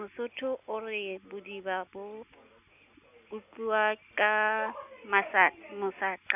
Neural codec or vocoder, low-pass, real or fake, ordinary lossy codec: none; 3.6 kHz; real; none